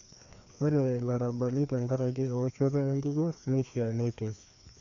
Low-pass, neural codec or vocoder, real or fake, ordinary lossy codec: 7.2 kHz; codec, 16 kHz, 2 kbps, FreqCodec, larger model; fake; none